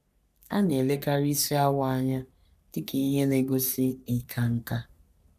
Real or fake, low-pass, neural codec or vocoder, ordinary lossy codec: fake; 14.4 kHz; codec, 44.1 kHz, 3.4 kbps, Pupu-Codec; none